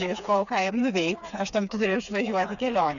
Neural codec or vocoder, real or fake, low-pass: codec, 16 kHz, 4 kbps, FreqCodec, smaller model; fake; 7.2 kHz